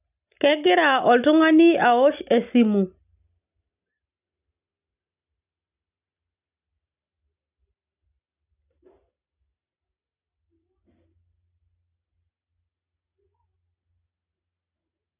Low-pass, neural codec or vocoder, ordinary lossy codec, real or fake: 3.6 kHz; none; none; real